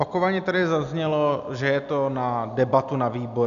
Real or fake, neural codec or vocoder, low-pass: real; none; 7.2 kHz